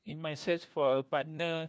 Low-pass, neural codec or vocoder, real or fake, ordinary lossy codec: none; codec, 16 kHz, 1 kbps, FunCodec, trained on LibriTTS, 50 frames a second; fake; none